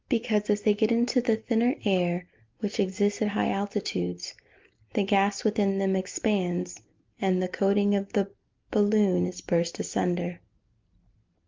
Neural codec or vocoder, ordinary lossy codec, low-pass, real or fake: none; Opus, 32 kbps; 7.2 kHz; real